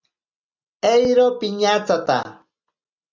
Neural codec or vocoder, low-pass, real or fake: none; 7.2 kHz; real